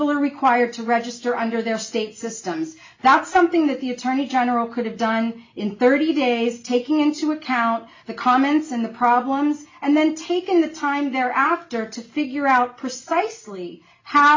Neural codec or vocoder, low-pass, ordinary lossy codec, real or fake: none; 7.2 kHz; MP3, 64 kbps; real